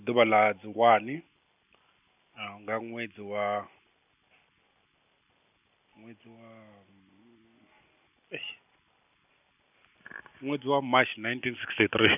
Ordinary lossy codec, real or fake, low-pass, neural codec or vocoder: none; real; 3.6 kHz; none